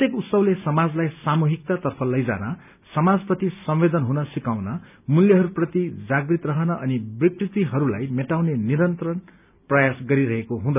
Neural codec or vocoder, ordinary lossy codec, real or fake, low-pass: none; none; real; 3.6 kHz